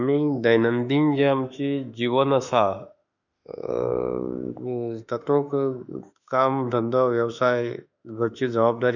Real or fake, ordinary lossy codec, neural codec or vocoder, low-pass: fake; none; autoencoder, 48 kHz, 32 numbers a frame, DAC-VAE, trained on Japanese speech; 7.2 kHz